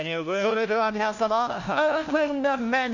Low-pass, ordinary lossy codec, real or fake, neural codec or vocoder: 7.2 kHz; none; fake; codec, 16 kHz, 1 kbps, FunCodec, trained on LibriTTS, 50 frames a second